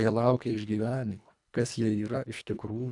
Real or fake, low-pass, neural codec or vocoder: fake; 10.8 kHz; codec, 24 kHz, 1.5 kbps, HILCodec